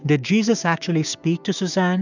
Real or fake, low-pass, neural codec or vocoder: fake; 7.2 kHz; codec, 16 kHz, 6 kbps, DAC